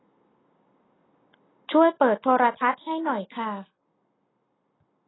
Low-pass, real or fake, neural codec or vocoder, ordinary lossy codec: 7.2 kHz; real; none; AAC, 16 kbps